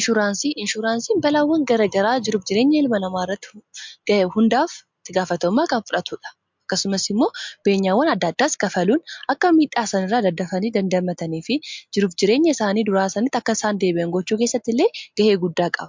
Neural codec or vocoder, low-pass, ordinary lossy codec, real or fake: none; 7.2 kHz; MP3, 64 kbps; real